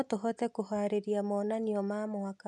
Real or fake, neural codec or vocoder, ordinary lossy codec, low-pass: real; none; none; none